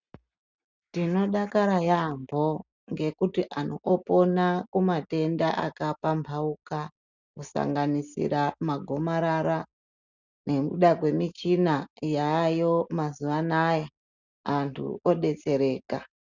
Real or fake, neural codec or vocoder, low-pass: real; none; 7.2 kHz